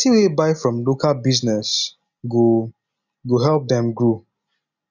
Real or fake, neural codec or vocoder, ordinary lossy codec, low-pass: real; none; none; 7.2 kHz